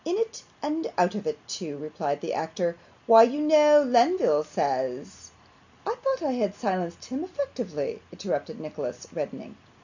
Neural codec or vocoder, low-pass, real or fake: none; 7.2 kHz; real